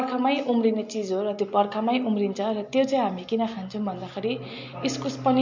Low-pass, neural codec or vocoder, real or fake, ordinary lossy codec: 7.2 kHz; none; real; MP3, 48 kbps